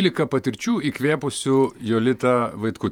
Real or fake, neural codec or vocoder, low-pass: fake; vocoder, 48 kHz, 128 mel bands, Vocos; 19.8 kHz